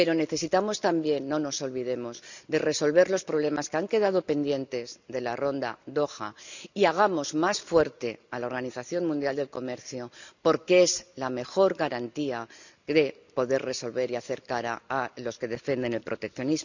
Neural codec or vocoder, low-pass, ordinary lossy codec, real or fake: none; 7.2 kHz; none; real